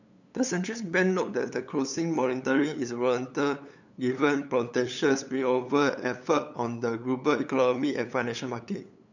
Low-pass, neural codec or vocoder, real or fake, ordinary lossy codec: 7.2 kHz; codec, 16 kHz, 8 kbps, FunCodec, trained on LibriTTS, 25 frames a second; fake; none